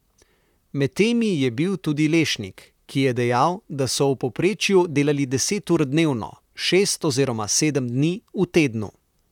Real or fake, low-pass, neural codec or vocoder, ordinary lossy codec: fake; 19.8 kHz; vocoder, 44.1 kHz, 128 mel bands, Pupu-Vocoder; none